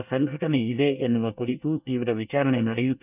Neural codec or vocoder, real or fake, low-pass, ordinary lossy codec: codec, 24 kHz, 1 kbps, SNAC; fake; 3.6 kHz; none